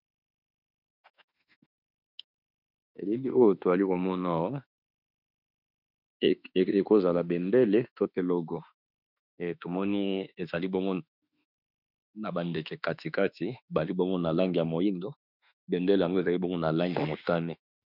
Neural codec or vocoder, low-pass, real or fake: autoencoder, 48 kHz, 32 numbers a frame, DAC-VAE, trained on Japanese speech; 5.4 kHz; fake